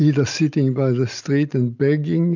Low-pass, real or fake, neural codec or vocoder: 7.2 kHz; real; none